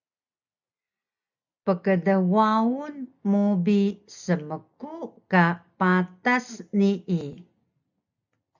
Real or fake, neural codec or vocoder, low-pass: real; none; 7.2 kHz